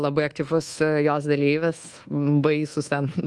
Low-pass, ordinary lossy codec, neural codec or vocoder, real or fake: 10.8 kHz; Opus, 24 kbps; codec, 24 kHz, 1.2 kbps, DualCodec; fake